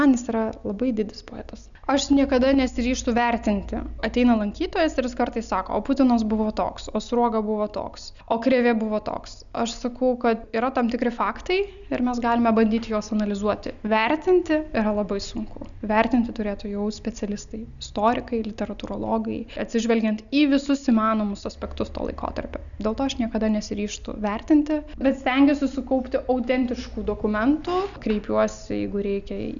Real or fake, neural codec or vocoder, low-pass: real; none; 7.2 kHz